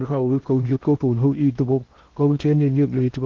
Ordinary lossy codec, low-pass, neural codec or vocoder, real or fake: Opus, 16 kbps; 7.2 kHz; codec, 16 kHz in and 24 kHz out, 0.6 kbps, FocalCodec, streaming, 2048 codes; fake